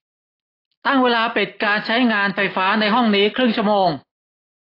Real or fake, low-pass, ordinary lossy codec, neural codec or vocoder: real; 5.4 kHz; AAC, 24 kbps; none